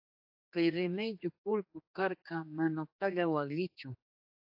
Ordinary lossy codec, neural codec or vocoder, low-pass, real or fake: AAC, 48 kbps; codec, 16 kHz, 2 kbps, X-Codec, HuBERT features, trained on general audio; 5.4 kHz; fake